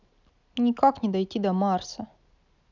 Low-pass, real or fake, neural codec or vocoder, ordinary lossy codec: 7.2 kHz; real; none; none